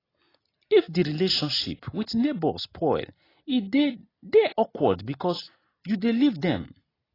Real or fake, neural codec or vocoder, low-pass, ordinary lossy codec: real; none; 5.4 kHz; AAC, 24 kbps